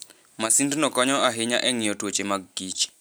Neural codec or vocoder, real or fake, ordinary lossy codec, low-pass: none; real; none; none